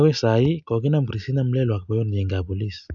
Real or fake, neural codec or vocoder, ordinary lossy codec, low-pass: real; none; none; 7.2 kHz